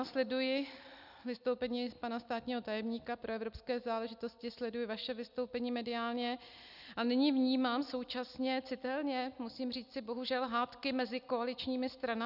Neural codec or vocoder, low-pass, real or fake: none; 5.4 kHz; real